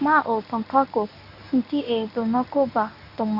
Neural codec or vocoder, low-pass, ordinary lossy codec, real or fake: codec, 24 kHz, 0.9 kbps, WavTokenizer, medium speech release version 1; 5.4 kHz; AAC, 48 kbps; fake